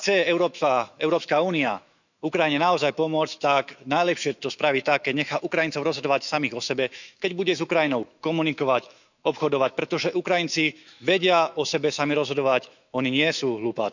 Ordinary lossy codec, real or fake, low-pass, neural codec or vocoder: none; fake; 7.2 kHz; autoencoder, 48 kHz, 128 numbers a frame, DAC-VAE, trained on Japanese speech